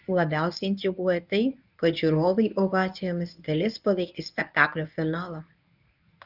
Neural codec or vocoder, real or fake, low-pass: codec, 24 kHz, 0.9 kbps, WavTokenizer, medium speech release version 1; fake; 5.4 kHz